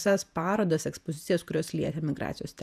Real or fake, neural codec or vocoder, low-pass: fake; vocoder, 48 kHz, 128 mel bands, Vocos; 14.4 kHz